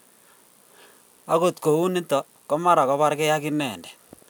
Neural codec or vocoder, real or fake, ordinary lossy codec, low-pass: none; real; none; none